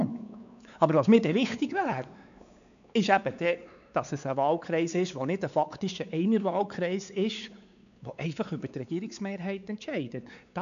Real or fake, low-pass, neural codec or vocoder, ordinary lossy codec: fake; 7.2 kHz; codec, 16 kHz, 4 kbps, X-Codec, WavLM features, trained on Multilingual LibriSpeech; none